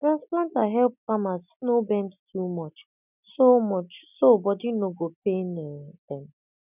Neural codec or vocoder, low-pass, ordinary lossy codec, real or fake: none; 3.6 kHz; none; real